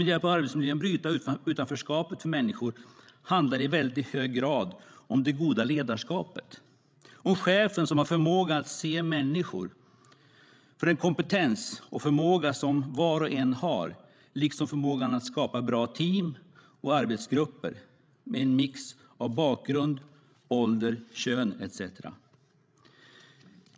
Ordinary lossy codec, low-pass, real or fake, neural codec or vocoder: none; none; fake; codec, 16 kHz, 16 kbps, FreqCodec, larger model